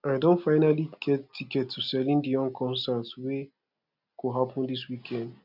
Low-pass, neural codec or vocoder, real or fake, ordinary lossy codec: 5.4 kHz; none; real; none